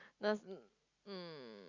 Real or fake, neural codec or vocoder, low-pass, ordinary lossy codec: real; none; 7.2 kHz; Opus, 64 kbps